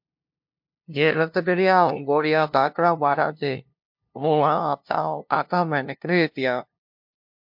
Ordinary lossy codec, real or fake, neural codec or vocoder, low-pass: MP3, 48 kbps; fake; codec, 16 kHz, 0.5 kbps, FunCodec, trained on LibriTTS, 25 frames a second; 5.4 kHz